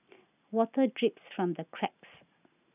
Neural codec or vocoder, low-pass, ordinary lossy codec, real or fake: none; 3.6 kHz; none; real